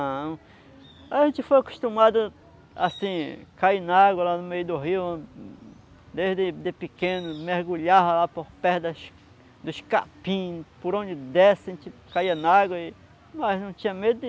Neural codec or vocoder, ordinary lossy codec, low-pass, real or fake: none; none; none; real